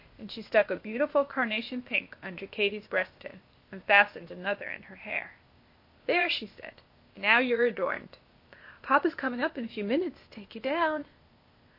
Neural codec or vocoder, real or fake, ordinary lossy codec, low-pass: codec, 16 kHz, 0.8 kbps, ZipCodec; fake; MP3, 32 kbps; 5.4 kHz